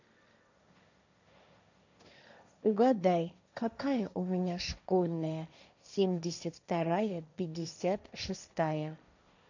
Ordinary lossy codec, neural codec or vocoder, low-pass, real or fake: none; codec, 16 kHz, 1.1 kbps, Voila-Tokenizer; 7.2 kHz; fake